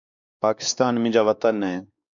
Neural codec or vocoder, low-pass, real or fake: codec, 16 kHz, 2 kbps, X-Codec, WavLM features, trained on Multilingual LibriSpeech; 7.2 kHz; fake